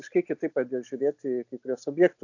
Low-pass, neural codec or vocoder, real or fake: 7.2 kHz; none; real